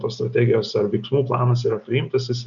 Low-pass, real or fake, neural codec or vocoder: 7.2 kHz; real; none